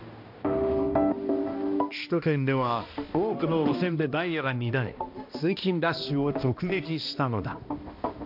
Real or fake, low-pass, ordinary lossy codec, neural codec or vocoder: fake; 5.4 kHz; MP3, 48 kbps; codec, 16 kHz, 1 kbps, X-Codec, HuBERT features, trained on balanced general audio